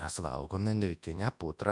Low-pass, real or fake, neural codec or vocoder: 10.8 kHz; fake; codec, 24 kHz, 0.9 kbps, WavTokenizer, large speech release